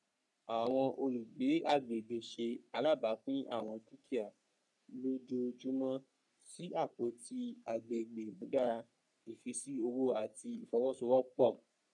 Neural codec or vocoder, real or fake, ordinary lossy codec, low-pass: codec, 44.1 kHz, 3.4 kbps, Pupu-Codec; fake; none; 10.8 kHz